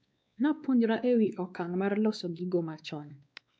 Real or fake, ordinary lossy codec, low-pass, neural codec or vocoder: fake; none; none; codec, 16 kHz, 2 kbps, X-Codec, WavLM features, trained on Multilingual LibriSpeech